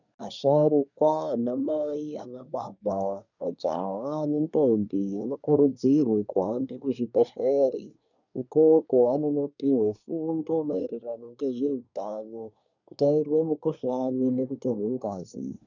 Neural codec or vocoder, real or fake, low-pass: codec, 24 kHz, 1 kbps, SNAC; fake; 7.2 kHz